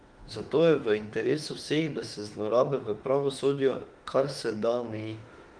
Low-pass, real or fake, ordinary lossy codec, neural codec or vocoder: 9.9 kHz; fake; Opus, 24 kbps; autoencoder, 48 kHz, 32 numbers a frame, DAC-VAE, trained on Japanese speech